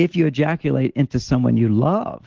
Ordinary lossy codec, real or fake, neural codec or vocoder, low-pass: Opus, 16 kbps; real; none; 7.2 kHz